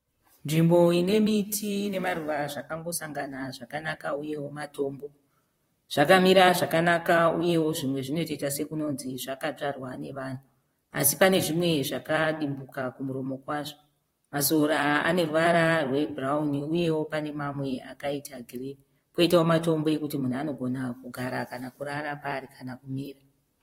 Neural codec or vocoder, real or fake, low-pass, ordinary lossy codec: vocoder, 44.1 kHz, 128 mel bands, Pupu-Vocoder; fake; 19.8 kHz; AAC, 48 kbps